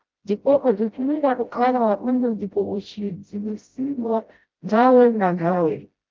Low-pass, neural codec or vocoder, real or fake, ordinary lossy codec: 7.2 kHz; codec, 16 kHz, 0.5 kbps, FreqCodec, smaller model; fake; Opus, 32 kbps